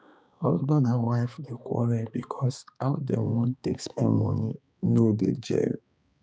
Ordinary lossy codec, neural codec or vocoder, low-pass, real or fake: none; codec, 16 kHz, 2 kbps, X-Codec, HuBERT features, trained on balanced general audio; none; fake